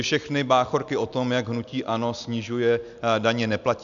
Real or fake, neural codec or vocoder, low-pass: real; none; 7.2 kHz